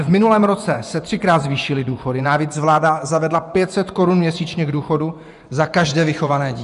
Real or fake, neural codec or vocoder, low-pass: fake; vocoder, 24 kHz, 100 mel bands, Vocos; 10.8 kHz